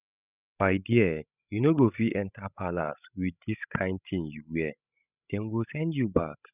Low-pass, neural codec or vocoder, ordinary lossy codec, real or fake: 3.6 kHz; codec, 16 kHz, 16 kbps, FreqCodec, larger model; none; fake